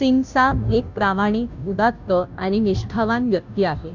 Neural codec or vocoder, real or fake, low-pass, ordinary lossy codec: codec, 16 kHz, 0.5 kbps, FunCodec, trained on Chinese and English, 25 frames a second; fake; 7.2 kHz; none